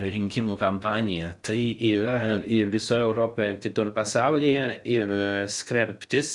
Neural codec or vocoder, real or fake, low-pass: codec, 16 kHz in and 24 kHz out, 0.6 kbps, FocalCodec, streaming, 4096 codes; fake; 10.8 kHz